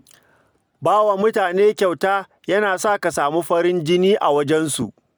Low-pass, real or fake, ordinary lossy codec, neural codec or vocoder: none; real; none; none